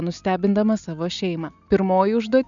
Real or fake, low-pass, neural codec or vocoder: real; 7.2 kHz; none